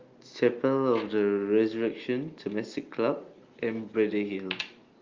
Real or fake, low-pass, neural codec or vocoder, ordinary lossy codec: real; 7.2 kHz; none; Opus, 32 kbps